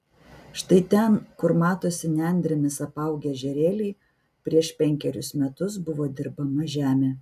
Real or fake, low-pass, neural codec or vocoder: real; 14.4 kHz; none